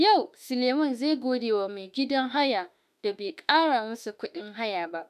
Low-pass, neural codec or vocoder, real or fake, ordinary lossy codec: 14.4 kHz; autoencoder, 48 kHz, 32 numbers a frame, DAC-VAE, trained on Japanese speech; fake; none